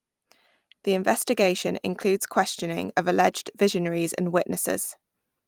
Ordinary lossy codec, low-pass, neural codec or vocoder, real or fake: Opus, 32 kbps; 19.8 kHz; vocoder, 44.1 kHz, 128 mel bands every 256 samples, BigVGAN v2; fake